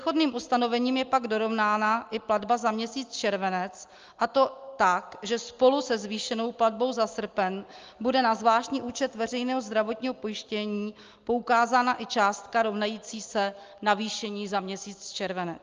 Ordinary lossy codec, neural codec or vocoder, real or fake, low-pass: Opus, 32 kbps; none; real; 7.2 kHz